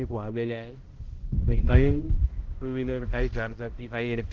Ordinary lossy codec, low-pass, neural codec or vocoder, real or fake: Opus, 16 kbps; 7.2 kHz; codec, 16 kHz, 0.5 kbps, X-Codec, HuBERT features, trained on balanced general audio; fake